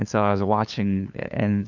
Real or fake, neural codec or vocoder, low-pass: fake; codec, 16 kHz, 2 kbps, FunCodec, trained on LibriTTS, 25 frames a second; 7.2 kHz